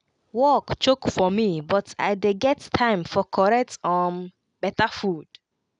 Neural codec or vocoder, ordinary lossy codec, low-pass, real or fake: none; none; 9.9 kHz; real